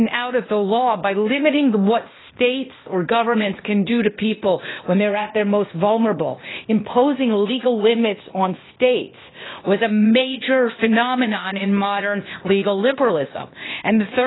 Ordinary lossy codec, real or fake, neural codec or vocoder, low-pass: AAC, 16 kbps; fake; codec, 16 kHz, 0.8 kbps, ZipCodec; 7.2 kHz